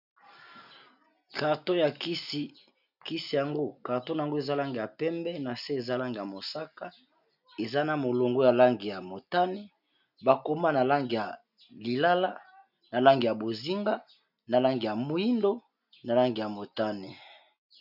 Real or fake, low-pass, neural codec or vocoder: real; 5.4 kHz; none